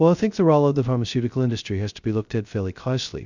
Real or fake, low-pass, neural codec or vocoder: fake; 7.2 kHz; codec, 16 kHz, 0.2 kbps, FocalCodec